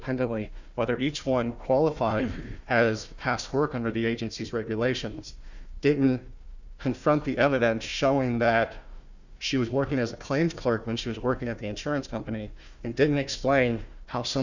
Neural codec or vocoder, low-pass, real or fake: codec, 16 kHz, 1 kbps, FunCodec, trained on Chinese and English, 50 frames a second; 7.2 kHz; fake